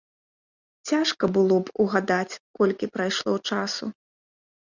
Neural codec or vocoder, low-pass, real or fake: none; 7.2 kHz; real